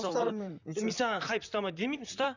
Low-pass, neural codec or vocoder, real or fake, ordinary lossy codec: 7.2 kHz; vocoder, 44.1 kHz, 128 mel bands, Pupu-Vocoder; fake; none